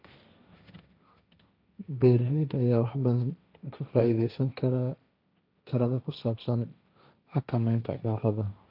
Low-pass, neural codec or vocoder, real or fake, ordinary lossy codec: 5.4 kHz; codec, 16 kHz, 1.1 kbps, Voila-Tokenizer; fake; none